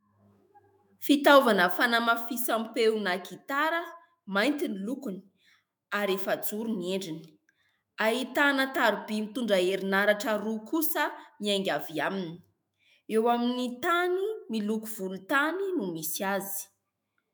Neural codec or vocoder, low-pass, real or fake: autoencoder, 48 kHz, 128 numbers a frame, DAC-VAE, trained on Japanese speech; 19.8 kHz; fake